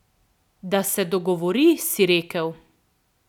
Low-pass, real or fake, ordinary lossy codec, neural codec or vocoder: 19.8 kHz; real; none; none